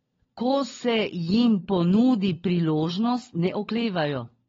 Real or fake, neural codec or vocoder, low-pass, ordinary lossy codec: fake; codec, 16 kHz, 16 kbps, FunCodec, trained on LibriTTS, 50 frames a second; 7.2 kHz; AAC, 24 kbps